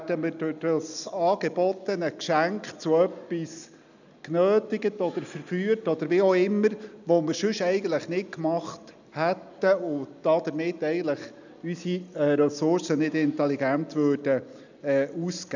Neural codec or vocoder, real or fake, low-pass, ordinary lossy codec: none; real; 7.2 kHz; none